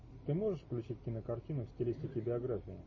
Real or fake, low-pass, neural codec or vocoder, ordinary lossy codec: real; 7.2 kHz; none; MP3, 32 kbps